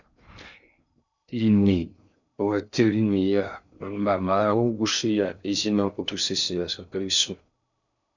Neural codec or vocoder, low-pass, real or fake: codec, 16 kHz in and 24 kHz out, 0.6 kbps, FocalCodec, streaming, 2048 codes; 7.2 kHz; fake